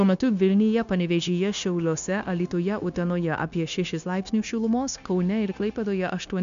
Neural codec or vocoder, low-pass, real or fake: codec, 16 kHz, 0.9 kbps, LongCat-Audio-Codec; 7.2 kHz; fake